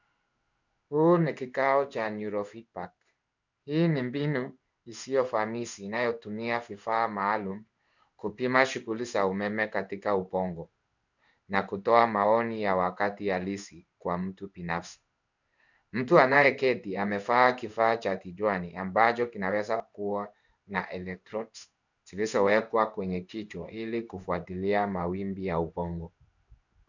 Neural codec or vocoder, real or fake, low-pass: codec, 16 kHz in and 24 kHz out, 1 kbps, XY-Tokenizer; fake; 7.2 kHz